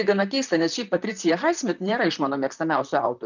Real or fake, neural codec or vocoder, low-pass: fake; vocoder, 44.1 kHz, 128 mel bands, Pupu-Vocoder; 7.2 kHz